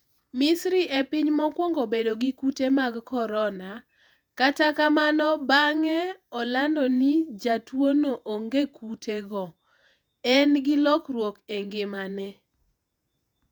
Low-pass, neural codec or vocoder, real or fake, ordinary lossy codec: 19.8 kHz; vocoder, 48 kHz, 128 mel bands, Vocos; fake; none